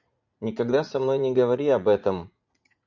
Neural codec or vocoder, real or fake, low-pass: vocoder, 44.1 kHz, 80 mel bands, Vocos; fake; 7.2 kHz